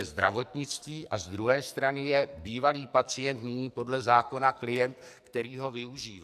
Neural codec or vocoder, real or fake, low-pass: codec, 44.1 kHz, 2.6 kbps, SNAC; fake; 14.4 kHz